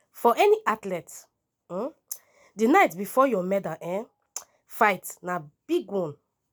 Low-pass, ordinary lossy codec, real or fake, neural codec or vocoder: none; none; real; none